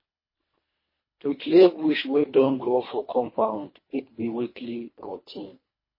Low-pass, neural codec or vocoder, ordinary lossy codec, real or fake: 5.4 kHz; codec, 24 kHz, 1.5 kbps, HILCodec; MP3, 24 kbps; fake